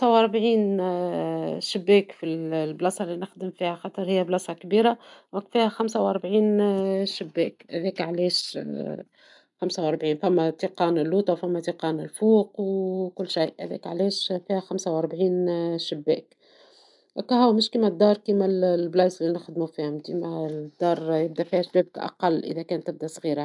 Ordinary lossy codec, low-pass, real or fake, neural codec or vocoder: none; 10.8 kHz; real; none